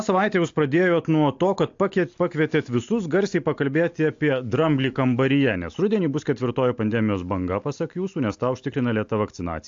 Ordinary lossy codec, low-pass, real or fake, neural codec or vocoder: AAC, 64 kbps; 7.2 kHz; real; none